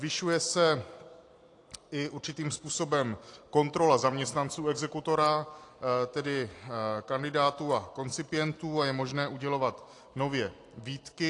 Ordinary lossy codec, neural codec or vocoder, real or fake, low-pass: AAC, 48 kbps; none; real; 10.8 kHz